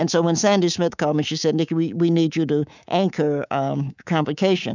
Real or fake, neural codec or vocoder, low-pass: fake; codec, 24 kHz, 3.1 kbps, DualCodec; 7.2 kHz